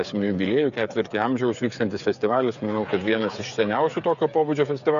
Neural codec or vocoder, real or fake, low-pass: codec, 16 kHz, 8 kbps, FreqCodec, smaller model; fake; 7.2 kHz